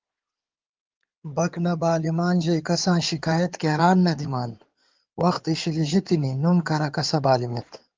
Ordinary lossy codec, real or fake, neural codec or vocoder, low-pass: Opus, 24 kbps; fake; codec, 16 kHz in and 24 kHz out, 2.2 kbps, FireRedTTS-2 codec; 7.2 kHz